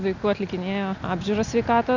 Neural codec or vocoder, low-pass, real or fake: none; 7.2 kHz; real